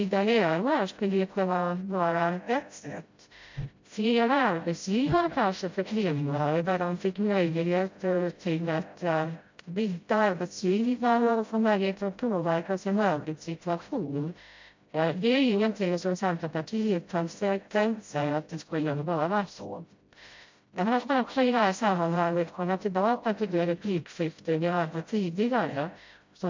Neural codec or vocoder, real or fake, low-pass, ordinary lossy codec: codec, 16 kHz, 0.5 kbps, FreqCodec, smaller model; fake; 7.2 kHz; MP3, 48 kbps